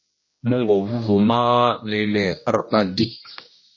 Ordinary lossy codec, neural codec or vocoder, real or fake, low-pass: MP3, 32 kbps; codec, 16 kHz, 1 kbps, X-Codec, HuBERT features, trained on general audio; fake; 7.2 kHz